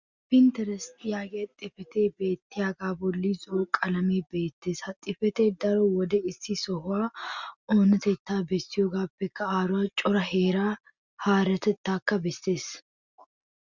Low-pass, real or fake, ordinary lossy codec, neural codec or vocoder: 7.2 kHz; real; Opus, 64 kbps; none